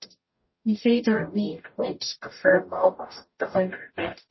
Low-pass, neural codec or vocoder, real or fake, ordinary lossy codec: 7.2 kHz; codec, 44.1 kHz, 0.9 kbps, DAC; fake; MP3, 24 kbps